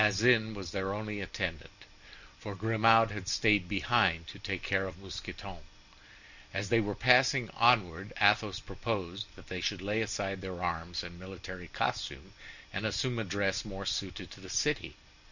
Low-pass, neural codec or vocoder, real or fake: 7.2 kHz; none; real